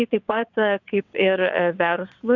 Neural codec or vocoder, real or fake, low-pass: vocoder, 22.05 kHz, 80 mel bands, WaveNeXt; fake; 7.2 kHz